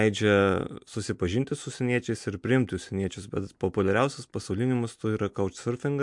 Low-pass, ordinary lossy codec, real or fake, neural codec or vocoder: 10.8 kHz; MP3, 64 kbps; real; none